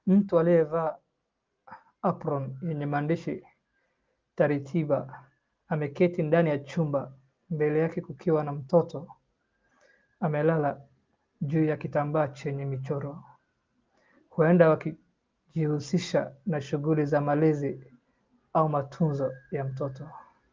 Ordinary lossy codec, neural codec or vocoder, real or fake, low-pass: Opus, 32 kbps; none; real; 7.2 kHz